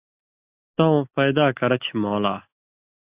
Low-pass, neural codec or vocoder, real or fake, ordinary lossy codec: 3.6 kHz; none; real; Opus, 64 kbps